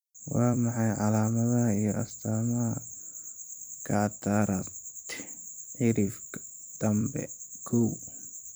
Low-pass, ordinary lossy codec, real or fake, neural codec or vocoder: none; none; fake; vocoder, 44.1 kHz, 128 mel bands every 256 samples, BigVGAN v2